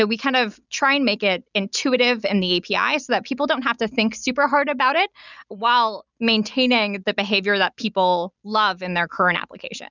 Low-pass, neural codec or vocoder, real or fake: 7.2 kHz; none; real